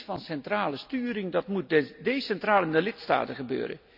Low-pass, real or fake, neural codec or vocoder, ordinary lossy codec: 5.4 kHz; real; none; none